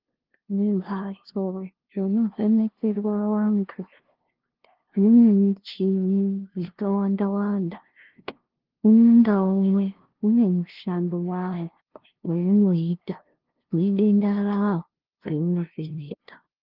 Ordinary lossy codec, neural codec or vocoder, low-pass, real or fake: Opus, 16 kbps; codec, 16 kHz, 0.5 kbps, FunCodec, trained on LibriTTS, 25 frames a second; 5.4 kHz; fake